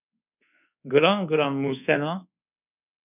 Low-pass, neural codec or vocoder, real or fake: 3.6 kHz; codec, 24 kHz, 1.2 kbps, DualCodec; fake